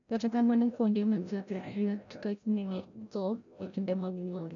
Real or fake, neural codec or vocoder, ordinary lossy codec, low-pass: fake; codec, 16 kHz, 0.5 kbps, FreqCodec, larger model; none; 7.2 kHz